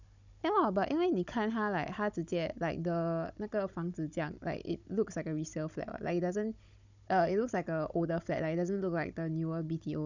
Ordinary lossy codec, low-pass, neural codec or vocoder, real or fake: none; 7.2 kHz; codec, 16 kHz, 16 kbps, FunCodec, trained on Chinese and English, 50 frames a second; fake